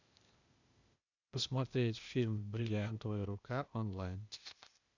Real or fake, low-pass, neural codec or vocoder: fake; 7.2 kHz; codec, 16 kHz, 0.8 kbps, ZipCodec